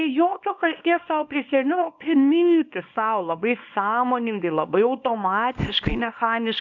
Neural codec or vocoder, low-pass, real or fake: codec, 24 kHz, 0.9 kbps, WavTokenizer, small release; 7.2 kHz; fake